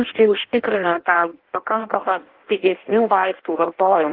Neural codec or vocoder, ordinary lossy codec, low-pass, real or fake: codec, 16 kHz in and 24 kHz out, 0.6 kbps, FireRedTTS-2 codec; Opus, 16 kbps; 5.4 kHz; fake